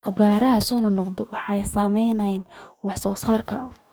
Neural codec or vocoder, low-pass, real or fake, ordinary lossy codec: codec, 44.1 kHz, 2.6 kbps, DAC; none; fake; none